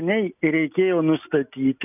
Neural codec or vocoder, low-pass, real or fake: none; 3.6 kHz; real